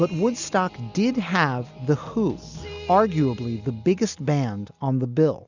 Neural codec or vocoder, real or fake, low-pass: none; real; 7.2 kHz